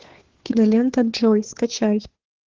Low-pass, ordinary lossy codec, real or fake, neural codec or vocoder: 7.2 kHz; Opus, 16 kbps; fake; codec, 16 kHz, 2 kbps, FunCodec, trained on Chinese and English, 25 frames a second